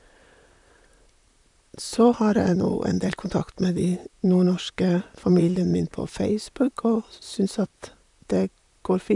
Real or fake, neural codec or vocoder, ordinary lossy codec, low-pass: fake; vocoder, 44.1 kHz, 128 mel bands, Pupu-Vocoder; none; 10.8 kHz